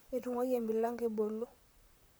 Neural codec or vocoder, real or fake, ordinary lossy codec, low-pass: vocoder, 44.1 kHz, 128 mel bands, Pupu-Vocoder; fake; none; none